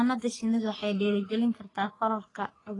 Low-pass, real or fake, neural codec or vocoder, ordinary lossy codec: 10.8 kHz; fake; codec, 44.1 kHz, 3.4 kbps, Pupu-Codec; AAC, 32 kbps